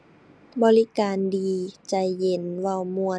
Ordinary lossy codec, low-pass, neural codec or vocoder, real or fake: none; none; none; real